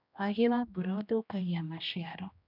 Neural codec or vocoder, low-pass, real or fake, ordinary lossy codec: codec, 16 kHz, 1 kbps, X-Codec, HuBERT features, trained on general audio; 5.4 kHz; fake; none